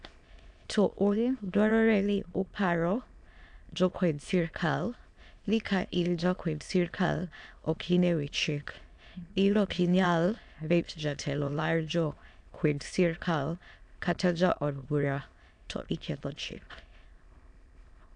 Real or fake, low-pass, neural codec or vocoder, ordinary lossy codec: fake; 9.9 kHz; autoencoder, 22.05 kHz, a latent of 192 numbers a frame, VITS, trained on many speakers; AAC, 64 kbps